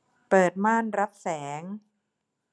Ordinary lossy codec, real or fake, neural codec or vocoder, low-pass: none; real; none; none